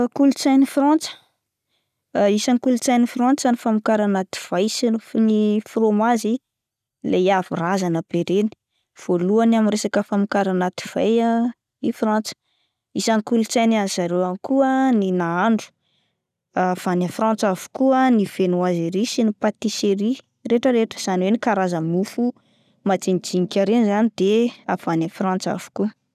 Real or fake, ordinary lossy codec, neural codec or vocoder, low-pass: real; none; none; 14.4 kHz